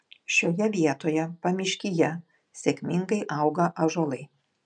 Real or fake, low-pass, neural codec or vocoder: real; 9.9 kHz; none